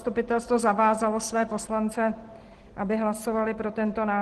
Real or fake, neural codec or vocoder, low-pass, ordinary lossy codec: real; none; 10.8 kHz; Opus, 16 kbps